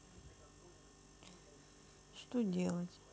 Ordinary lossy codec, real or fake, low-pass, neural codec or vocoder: none; real; none; none